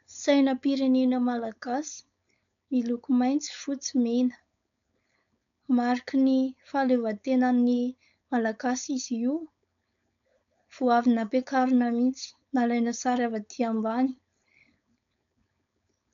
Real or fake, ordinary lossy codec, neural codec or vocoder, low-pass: fake; MP3, 96 kbps; codec, 16 kHz, 4.8 kbps, FACodec; 7.2 kHz